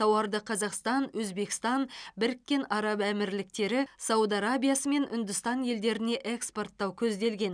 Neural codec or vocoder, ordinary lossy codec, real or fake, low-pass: none; none; real; 9.9 kHz